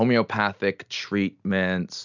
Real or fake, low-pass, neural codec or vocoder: real; 7.2 kHz; none